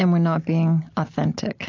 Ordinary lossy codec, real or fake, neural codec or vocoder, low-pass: AAC, 48 kbps; real; none; 7.2 kHz